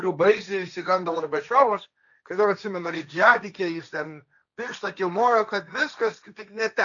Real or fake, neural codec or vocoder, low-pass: fake; codec, 16 kHz, 1.1 kbps, Voila-Tokenizer; 7.2 kHz